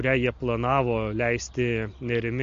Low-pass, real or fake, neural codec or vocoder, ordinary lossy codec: 7.2 kHz; real; none; MP3, 48 kbps